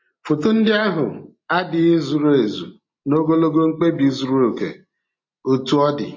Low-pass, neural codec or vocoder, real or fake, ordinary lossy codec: 7.2 kHz; none; real; MP3, 32 kbps